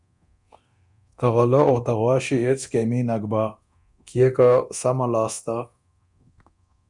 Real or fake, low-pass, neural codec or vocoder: fake; 10.8 kHz; codec, 24 kHz, 0.9 kbps, DualCodec